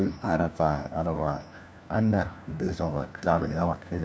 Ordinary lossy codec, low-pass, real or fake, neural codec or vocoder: none; none; fake; codec, 16 kHz, 1 kbps, FunCodec, trained on LibriTTS, 50 frames a second